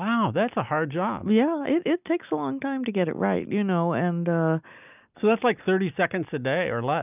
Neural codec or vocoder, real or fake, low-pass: none; real; 3.6 kHz